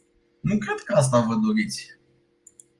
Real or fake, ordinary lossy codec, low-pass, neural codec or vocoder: real; Opus, 24 kbps; 10.8 kHz; none